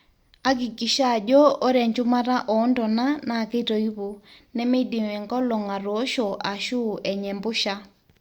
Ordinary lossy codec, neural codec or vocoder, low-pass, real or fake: Opus, 64 kbps; none; 19.8 kHz; real